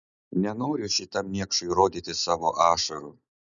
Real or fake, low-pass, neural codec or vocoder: real; 7.2 kHz; none